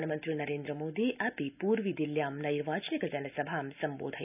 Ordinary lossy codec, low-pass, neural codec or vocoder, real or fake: none; 3.6 kHz; none; real